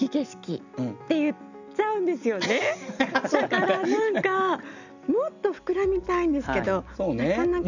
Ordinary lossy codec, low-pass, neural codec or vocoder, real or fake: none; 7.2 kHz; none; real